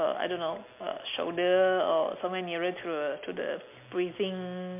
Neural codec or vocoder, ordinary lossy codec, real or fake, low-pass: none; MP3, 32 kbps; real; 3.6 kHz